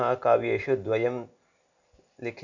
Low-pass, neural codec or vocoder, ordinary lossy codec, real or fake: 7.2 kHz; none; none; real